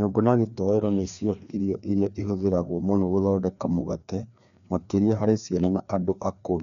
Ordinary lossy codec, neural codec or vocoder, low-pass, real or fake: none; codec, 16 kHz, 2 kbps, FreqCodec, larger model; 7.2 kHz; fake